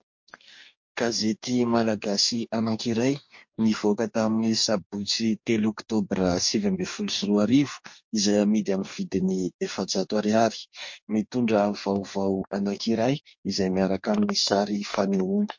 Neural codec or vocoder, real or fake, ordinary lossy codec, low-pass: codec, 44.1 kHz, 2.6 kbps, DAC; fake; MP3, 48 kbps; 7.2 kHz